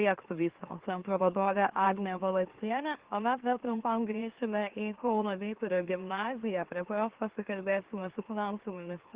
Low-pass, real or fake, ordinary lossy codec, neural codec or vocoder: 3.6 kHz; fake; Opus, 16 kbps; autoencoder, 44.1 kHz, a latent of 192 numbers a frame, MeloTTS